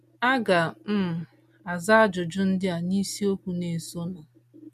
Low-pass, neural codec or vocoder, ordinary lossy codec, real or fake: 14.4 kHz; none; MP3, 64 kbps; real